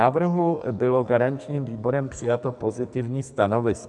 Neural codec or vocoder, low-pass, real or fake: codec, 44.1 kHz, 2.6 kbps, SNAC; 10.8 kHz; fake